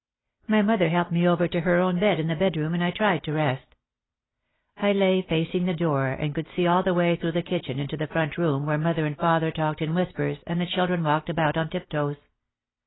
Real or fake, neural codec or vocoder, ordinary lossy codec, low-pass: real; none; AAC, 16 kbps; 7.2 kHz